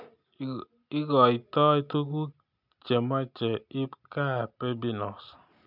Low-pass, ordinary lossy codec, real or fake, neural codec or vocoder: 5.4 kHz; none; real; none